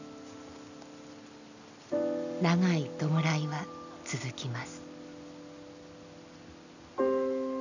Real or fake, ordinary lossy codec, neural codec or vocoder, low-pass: real; none; none; 7.2 kHz